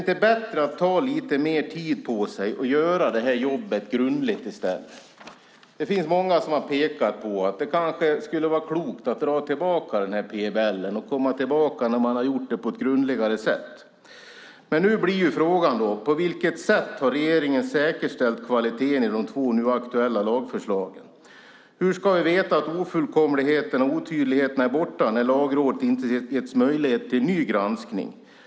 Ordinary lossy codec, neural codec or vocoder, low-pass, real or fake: none; none; none; real